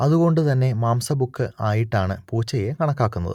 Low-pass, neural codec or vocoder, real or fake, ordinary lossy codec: 19.8 kHz; none; real; none